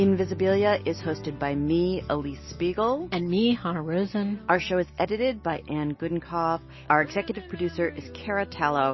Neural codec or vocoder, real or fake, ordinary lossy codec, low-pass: none; real; MP3, 24 kbps; 7.2 kHz